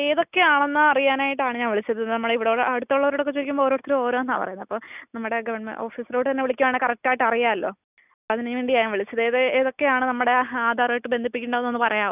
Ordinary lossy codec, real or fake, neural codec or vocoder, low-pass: none; real; none; 3.6 kHz